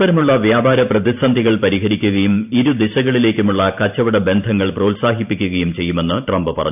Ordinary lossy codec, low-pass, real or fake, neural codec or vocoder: none; 3.6 kHz; fake; vocoder, 44.1 kHz, 128 mel bands every 512 samples, BigVGAN v2